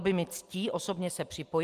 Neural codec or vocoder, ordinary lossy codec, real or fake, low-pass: none; Opus, 24 kbps; real; 14.4 kHz